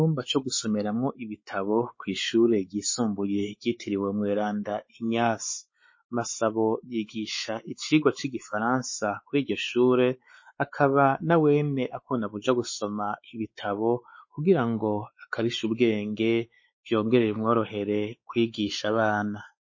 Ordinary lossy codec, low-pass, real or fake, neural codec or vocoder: MP3, 32 kbps; 7.2 kHz; fake; codec, 16 kHz, 4 kbps, X-Codec, WavLM features, trained on Multilingual LibriSpeech